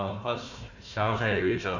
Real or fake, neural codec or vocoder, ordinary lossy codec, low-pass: fake; codec, 16 kHz, 1 kbps, FunCodec, trained on Chinese and English, 50 frames a second; none; 7.2 kHz